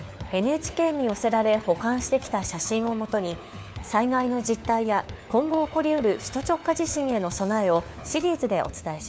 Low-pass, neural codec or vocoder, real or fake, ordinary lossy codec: none; codec, 16 kHz, 4 kbps, FunCodec, trained on LibriTTS, 50 frames a second; fake; none